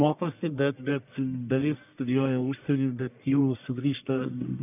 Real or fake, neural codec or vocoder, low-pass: fake; codec, 44.1 kHz, 1.7 kbps, Pupu-Codec; 3.6 kHz